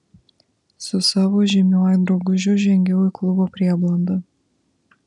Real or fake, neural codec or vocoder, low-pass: real; none; 10.8 kHz